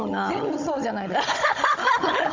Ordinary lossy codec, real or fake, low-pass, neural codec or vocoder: MP3, 64 kbps; fake; 7.2 kHz; codec, 16 kHz, 16 kbps, FunCodec, trained on Chinese and English, 50 frames a second